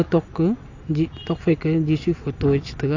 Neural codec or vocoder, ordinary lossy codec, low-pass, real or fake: none; AAC, 48 kbps; 7.2 kHz; real